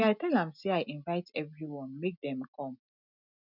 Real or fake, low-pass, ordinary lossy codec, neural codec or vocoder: real; 5.4 kHz; none; none